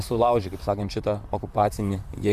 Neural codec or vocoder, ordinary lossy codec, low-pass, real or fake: vocoder, 44.1 kHz, 128 mel bands, Pupu-Vocoder; Opus, 64 kbps; 14.4 kHz; fake